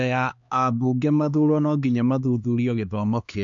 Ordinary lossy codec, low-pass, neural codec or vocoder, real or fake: AAC, 64 kbps; 7.2 kHz; codec, 16 kHz, 2 kbps, X-Codec, HuBERT features, trained on LibriSpeech; fake